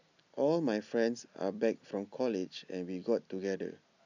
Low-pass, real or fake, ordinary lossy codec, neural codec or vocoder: 7.2 kHz; real; none; none